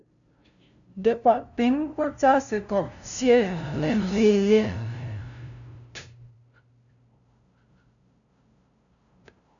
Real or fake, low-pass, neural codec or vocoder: fake; 7.2 kHz; codec, 16 kHz, 0.5 kbps, FunCodec, trained on LibriTTS, 25 frames a second